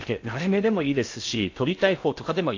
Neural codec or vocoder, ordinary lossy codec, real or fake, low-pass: codec, 16 kHz in and 24 kHz out, 0.6 kbps, FocalCodec, streaming, 2048 codes; AAC, 48 kbps; fake; 7.2 kHz